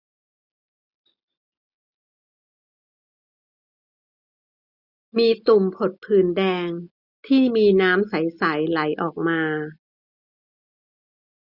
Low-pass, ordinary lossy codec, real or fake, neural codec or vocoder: 5.4 kHz; none; real; none